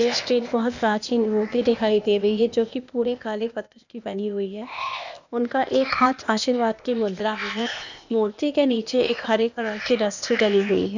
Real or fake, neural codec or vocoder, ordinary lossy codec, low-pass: fake; codec, 16 kHz, 0.8 kbps, ZipCodec; none; 7.2 kHz